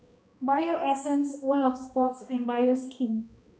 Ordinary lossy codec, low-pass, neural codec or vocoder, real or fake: none; none; codec, 16 kHz, 1 kbps, X-Codec, HuBERT features, trained on balanced general audio; fake